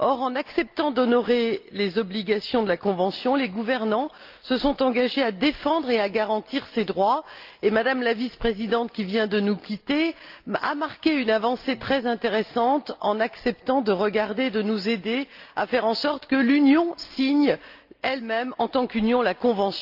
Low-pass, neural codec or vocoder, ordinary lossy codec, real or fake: 5.4 kHz; none; Opus, 24 kbps; real